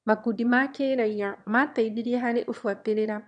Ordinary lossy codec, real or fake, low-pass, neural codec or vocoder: none; fake; 9.9 kHz; autoencoder, 22.05 kHz, a latent of 192 numbers a frame, VITS, trained on one speaker